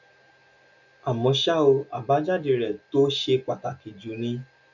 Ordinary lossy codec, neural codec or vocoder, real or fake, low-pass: none; none; real; 7.2 kHz